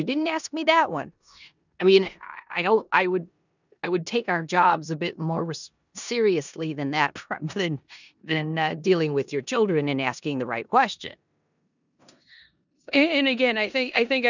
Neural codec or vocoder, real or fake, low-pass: codec, 16 kHz in and 24 kHz out, 0.9 kbps, LongCat-Audio-Codec, four codebook decoder; fake; 7.2 kHz